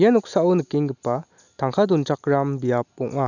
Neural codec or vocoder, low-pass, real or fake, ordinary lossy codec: none; 7.2 kHz; real; none